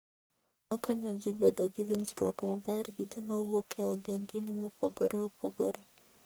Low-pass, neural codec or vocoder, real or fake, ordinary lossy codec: none; codec, 44.1 kHz, 1.7 kbps, Pupu-Codec; fake; none